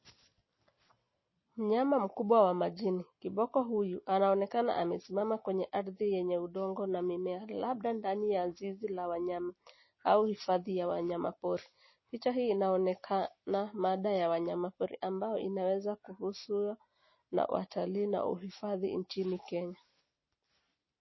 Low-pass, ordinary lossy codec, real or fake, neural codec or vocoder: 7.2 kHz; MP3, 24 kbps; real; none